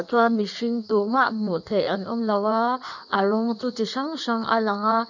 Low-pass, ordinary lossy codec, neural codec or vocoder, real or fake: 7.2 kHz; none; codec, 16 kHz in and 24 kHz out, 1.1 kbps, FireRedTTS-2 codec; fake